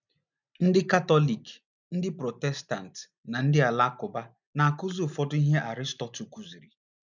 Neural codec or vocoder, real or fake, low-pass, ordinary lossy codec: none; real; 7.2 kHz; none